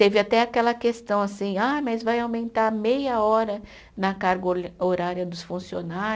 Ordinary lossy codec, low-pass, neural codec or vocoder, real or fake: none; none; none; real